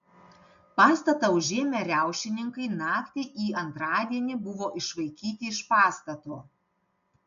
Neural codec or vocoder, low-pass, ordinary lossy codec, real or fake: none; 7.2 kHz; MP3, 96 kbps; real